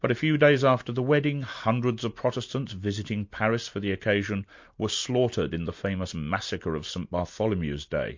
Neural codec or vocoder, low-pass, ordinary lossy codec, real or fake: none; 7.2 kHz; MP3, 48 kbps; real